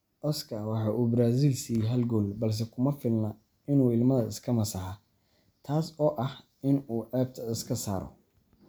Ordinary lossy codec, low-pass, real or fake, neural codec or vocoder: none; none; real; none